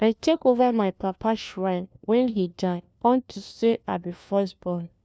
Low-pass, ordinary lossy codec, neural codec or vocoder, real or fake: none; none; codec, 16 kHz, 1 kbps, FunCodec, trained on LibriTTS, 50 frames a second; fake